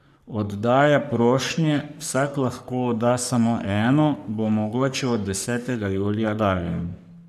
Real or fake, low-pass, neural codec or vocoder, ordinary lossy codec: fake; 14.4 kHz; codec, 44.1 kHz, 3.4 kbps, Pupu-Codec; none